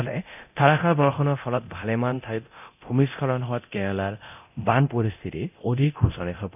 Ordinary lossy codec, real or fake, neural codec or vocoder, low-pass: none; fake; codec, 24 kHz, 0.9 kbps, DualCodec; 3.6 kHz